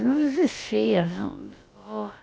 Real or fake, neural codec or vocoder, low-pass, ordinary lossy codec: fake; codec, 16 kHz, about 1 kbps, DyCAST, with the encoder's durations; none; none